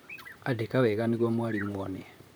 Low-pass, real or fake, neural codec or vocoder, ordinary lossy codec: none; fake; vocoder, 44.1 kHz, 128 mel bands, Pupu-Vocoder; none